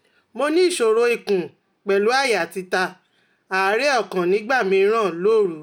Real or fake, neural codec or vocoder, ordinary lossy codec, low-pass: real; none; none; 19.8 kHz